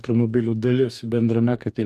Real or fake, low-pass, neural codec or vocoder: fake; 14.4 kHz; codec, 44.1 kHz, 2.6 kbps, DAC